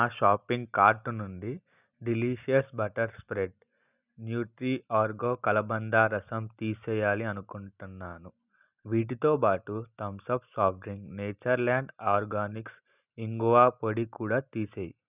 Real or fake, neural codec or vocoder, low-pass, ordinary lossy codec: real; none; 3.6 kHz; none